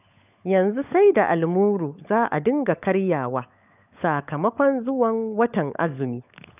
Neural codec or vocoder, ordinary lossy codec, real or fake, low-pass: codec, 16 kHz, 16 kbps, FunCodec, trained on LibriTTS, 50 frames a second; none; fake; 3.6 kHz